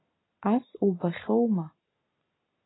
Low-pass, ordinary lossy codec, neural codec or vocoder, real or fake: 7.2 kHz; AAC, 16 kbps; none; real